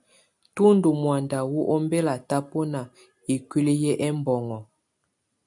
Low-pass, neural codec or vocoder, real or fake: 10.8 kHz; none; real